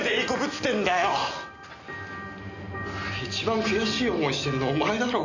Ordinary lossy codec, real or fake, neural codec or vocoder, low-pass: none; real; none; 7.2 kHz